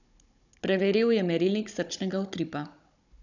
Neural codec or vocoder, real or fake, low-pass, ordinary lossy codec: codec, 16 kHz, 16 kbps, FunCodec, trained on Chinese and English, 50 frames a second; fake; 7.2 kHz; none